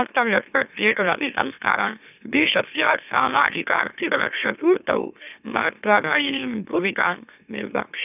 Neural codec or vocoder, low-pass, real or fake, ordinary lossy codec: autoencoder, 44.1 kHz, a latent of 192 numbers a frame, MeloTTS; 3.6 kHz; fake; none